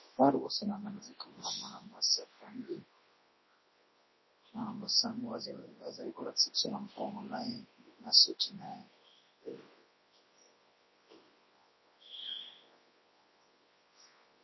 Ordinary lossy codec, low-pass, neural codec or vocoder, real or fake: MP3, 24 kbps; 7.2 kHz; codec, 24 kHz, 0.9 kbps, WavTokenizer, large speech release; fake